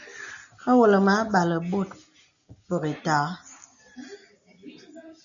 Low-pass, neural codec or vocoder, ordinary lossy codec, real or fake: 7.2 kHz; none; MP3, 96 kbps; real